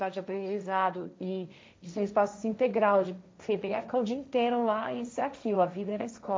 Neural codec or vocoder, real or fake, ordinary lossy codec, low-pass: codec, 16 kHz, 1.1 kbps, Voila-Tokenizer; fake; none; none